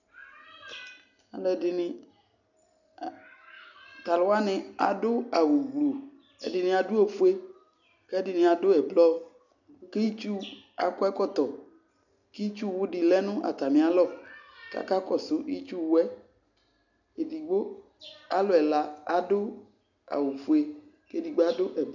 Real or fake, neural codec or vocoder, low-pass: real; none; 7.2 kHz